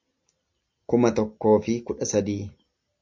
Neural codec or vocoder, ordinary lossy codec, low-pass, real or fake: none; MP3, 48 kbps; 7.2 kHz; real